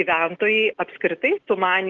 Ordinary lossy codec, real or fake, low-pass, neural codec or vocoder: Opus, 16 kbps; real; 7.2 kHz; none